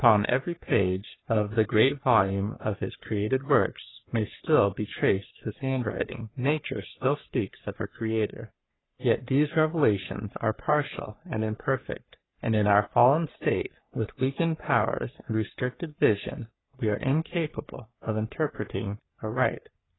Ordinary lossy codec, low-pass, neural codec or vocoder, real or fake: AAC, 16 kbps; 7.2 kHz; codec, 44.1 kHz, 3.4 kbps, Pupu-Codec; fake